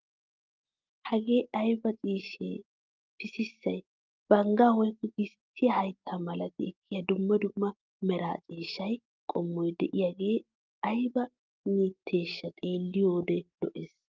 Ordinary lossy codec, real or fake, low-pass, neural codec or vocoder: Opus, 32 kbps; real; 7.2 kHz; none